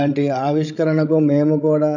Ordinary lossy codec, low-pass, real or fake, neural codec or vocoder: none; 7.2 kHz; fake; codec, 16 kHz, 16 kbps, FreqCodec, larger model